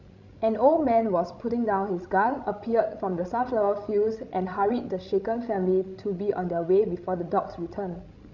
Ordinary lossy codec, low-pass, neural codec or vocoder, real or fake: none; 7.2 kHz; codec, 16 kHz, 16 kbps, FreqCodec, larger model; fake